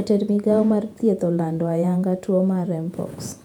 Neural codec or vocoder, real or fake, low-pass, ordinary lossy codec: vocoder, 48 kHz, 128 mel bands, Vocos; fake; 19.8 kHz; none